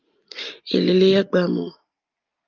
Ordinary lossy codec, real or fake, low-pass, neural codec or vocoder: Opus, 24 kbps; real; 7.2 kHz; none